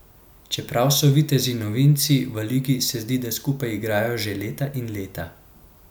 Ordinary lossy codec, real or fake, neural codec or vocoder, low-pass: none; real; none; 19.8 kHz